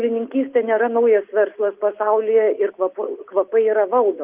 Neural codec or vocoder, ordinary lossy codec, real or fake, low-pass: none; Opus, 32 kbps; real; 3.6 kHz